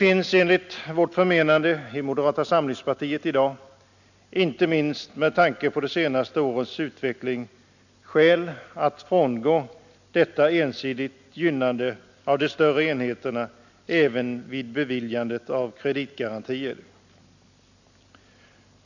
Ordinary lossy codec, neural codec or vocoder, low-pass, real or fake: none; none; 7.2 kHz; real